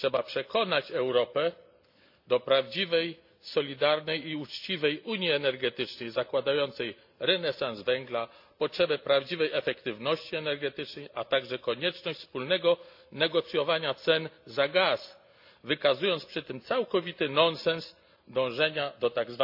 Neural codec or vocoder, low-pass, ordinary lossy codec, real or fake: none; 5.4 kHz; MP3, 48 kbps; real